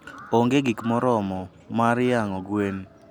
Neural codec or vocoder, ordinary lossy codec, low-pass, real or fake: none; none; 19.8 kHz; real